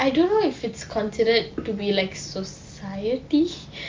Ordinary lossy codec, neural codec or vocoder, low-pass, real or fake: Opus, 32 kbps; none; 7.2 kHz; real